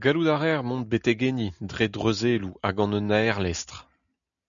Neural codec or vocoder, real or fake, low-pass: none; real; 7.2 kHz